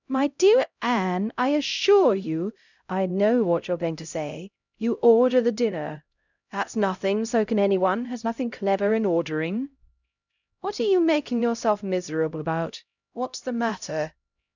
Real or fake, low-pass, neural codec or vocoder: fake; 7.2 kHz; codec, 16 kHz, 0.5 kbps, X-Codec, HuBERT features, trained on LibriSpeech